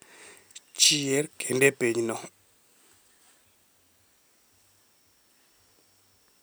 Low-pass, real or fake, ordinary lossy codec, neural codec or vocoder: none; real; none; none